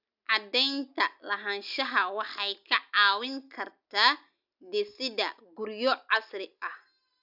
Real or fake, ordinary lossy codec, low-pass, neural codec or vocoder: real; none; 5.4 kHz; none